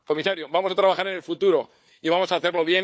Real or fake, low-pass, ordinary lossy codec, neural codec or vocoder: fake; none; none; codec, 16 kHz, 4 kbps, FunCodec, trained on Chinese and English, 50 frames a second